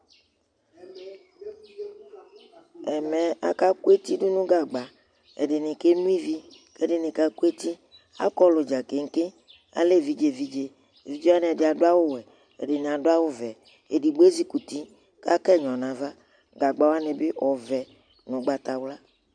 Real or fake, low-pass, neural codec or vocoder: real; 9.9 kHz; none